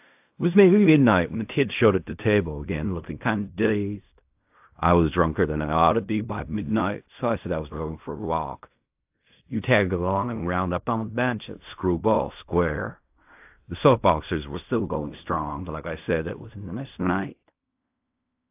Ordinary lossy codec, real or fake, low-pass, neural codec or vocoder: AAC, 32 kbps; fake; 3.6 kHz; codec, 16 kHz in and 24 kHz out, 0.4 kbps, LongCat-Audio-Codec, fine tuned four codebook decoder